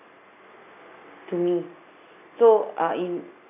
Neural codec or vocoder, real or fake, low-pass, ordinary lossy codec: none; real; 3.6 kHz; none